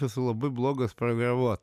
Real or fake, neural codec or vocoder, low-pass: real; none; 14.4 kHz